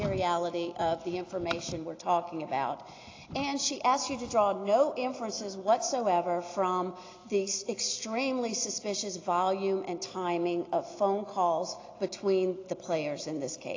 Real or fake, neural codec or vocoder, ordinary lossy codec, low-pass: real; none; AAC, 32 kbps; 7.2 kHz